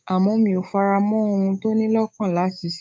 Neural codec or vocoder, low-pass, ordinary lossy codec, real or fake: codec, 16 kHz, 6 kbps, DAC; none; none; fake